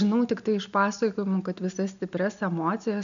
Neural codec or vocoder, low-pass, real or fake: none; 7.2 kHz; real